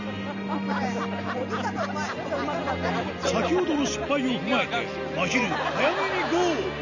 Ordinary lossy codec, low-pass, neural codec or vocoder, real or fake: none; 7.2 kHz; none; real